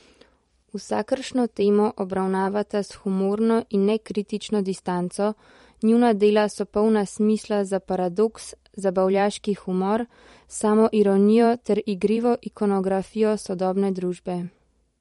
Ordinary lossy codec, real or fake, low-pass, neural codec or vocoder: MP3, 48 kbps; fake; 19.8 kHz; vocoder, 44.1 kHz, 128 mel bands every 512 samples, BigVGAN v2